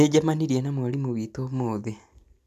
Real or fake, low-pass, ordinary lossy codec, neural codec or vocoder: real; 14.4 kHz; none; none